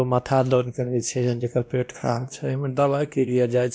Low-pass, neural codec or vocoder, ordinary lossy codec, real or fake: none; codec, 16 kHz, 1 kbps, X-Codec, WavLM features, trained on Multilingual LibriSpeech; none; fake